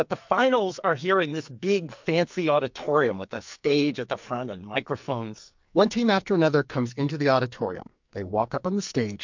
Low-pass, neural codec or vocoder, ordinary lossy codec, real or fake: 7.2 kHz; codec, 44.1 kHz, 2.6 kbps, SNAC; MP3, 64 kbps; fake